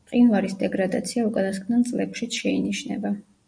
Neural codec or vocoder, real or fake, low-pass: none; real; 9.9 kHz